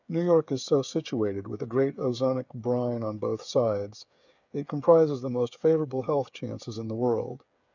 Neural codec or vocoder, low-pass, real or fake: codec, 16 kHz, 8 kbps, FreqCodec, smaller model; 7.2 kHz; fake